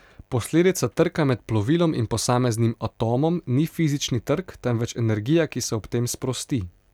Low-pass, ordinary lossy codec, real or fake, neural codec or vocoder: 19.8 kHz; none; real; none